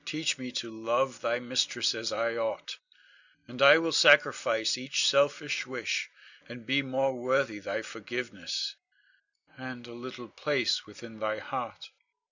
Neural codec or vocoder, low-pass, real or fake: none; 7.2 kHz; real